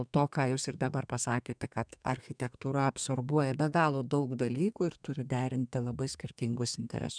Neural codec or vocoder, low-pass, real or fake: codec, 32 kHz, 1.9 kbps, SNAC; 9.9 kHz; fake